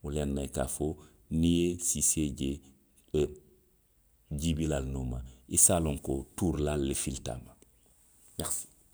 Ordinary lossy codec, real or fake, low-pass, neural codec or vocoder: none; real; none; none